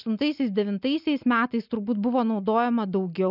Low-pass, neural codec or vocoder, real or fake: 5.4 kHz; none; real